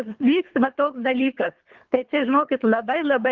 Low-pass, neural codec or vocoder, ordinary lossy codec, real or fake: 7.2 kHz; codec, 24 kHz, 3 kbps, HILCodec; Opus, 32 kbps; fake